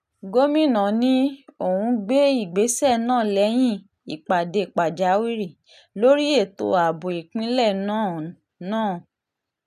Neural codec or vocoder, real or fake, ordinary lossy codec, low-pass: none; real; none; 14.4 kHz